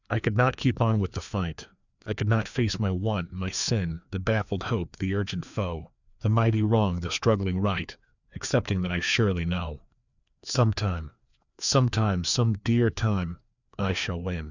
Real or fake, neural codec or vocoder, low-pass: fake; codec, 16 kHz, 2 kbps, FreqCodec, larger model; 7.2 kHz